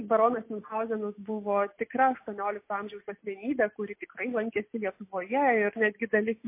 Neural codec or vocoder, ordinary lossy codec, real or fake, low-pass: none; MP3, 24 kbps; real; 3.6 kHz